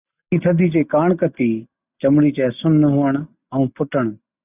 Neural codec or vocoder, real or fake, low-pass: none; real; 3.6 kHz